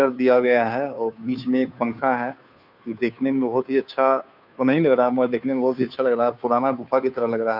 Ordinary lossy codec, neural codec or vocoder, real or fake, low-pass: none; codec, 16 kHz, 2 kbps, FunCodec, trained on Chinese and English, 25 frames a second; fake; 5.4 kHz